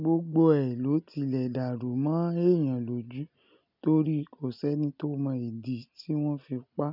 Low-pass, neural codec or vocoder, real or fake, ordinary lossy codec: 5.4 kHz; none; real; none